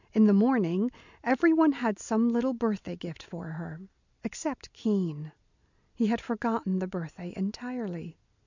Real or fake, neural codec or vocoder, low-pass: real; none; 7.2 kHz